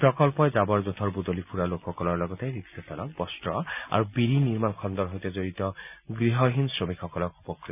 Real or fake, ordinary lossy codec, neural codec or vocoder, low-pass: real; none; none; 3.6 kHz